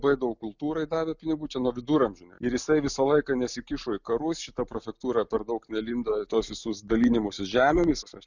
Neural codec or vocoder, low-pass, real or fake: none; 7.2 kHz; real